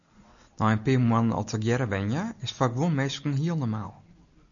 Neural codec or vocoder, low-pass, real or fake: none; 7.2 kHz; real